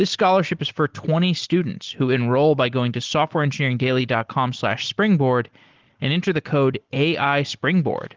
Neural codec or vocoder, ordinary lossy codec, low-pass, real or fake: none; Opus, 16 kbps; 7.2 kHz; real